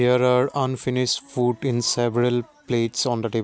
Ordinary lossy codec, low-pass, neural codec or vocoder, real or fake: none; none; none; real